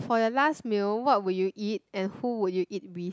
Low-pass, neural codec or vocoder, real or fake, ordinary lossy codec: none; none; real; none